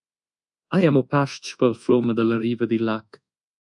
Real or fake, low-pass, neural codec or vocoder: fake; 10.8 kHz; codec, 24 kHz, 1.2 kbps, DualCodec